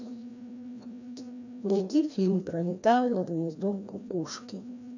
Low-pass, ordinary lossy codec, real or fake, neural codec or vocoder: 7.2 kHz; none; fake; codec, 16 kHz, 1 kbps, FreqCodec, larger model